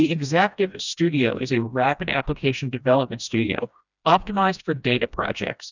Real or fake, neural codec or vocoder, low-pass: fake; codec, 16 kHz, 1 kbps, FreqCodec, smaller model; 7.2 kHz